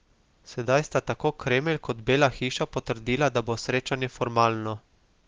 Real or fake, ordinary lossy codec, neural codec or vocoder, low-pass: real; Opus, 16 kbps; none; 7.2 kHz